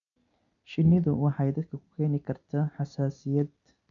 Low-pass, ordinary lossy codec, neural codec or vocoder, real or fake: 7.2 kHz; none; none; real